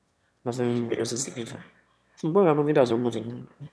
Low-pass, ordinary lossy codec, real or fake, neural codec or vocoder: none; none; fake; autoencoder, 22.05 kHz, a latent of 192 numbers a frame, VITS, trained on one speaker